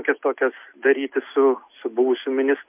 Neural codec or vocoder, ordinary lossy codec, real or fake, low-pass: none; MP3, 32 kbps; real; 3.6 kHz